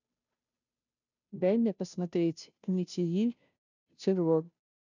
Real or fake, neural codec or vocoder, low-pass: fake; codec, 16 kHz, 0.5 kbps, FunCodec, trained on Chinese and English, 25 frames a second; 7.2 kHz